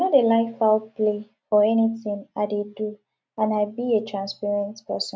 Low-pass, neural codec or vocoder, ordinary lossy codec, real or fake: none; none; none; real